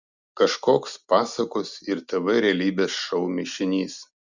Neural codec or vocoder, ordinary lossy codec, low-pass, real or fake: none; Opus, 64 kbps; 7.2 kHz; real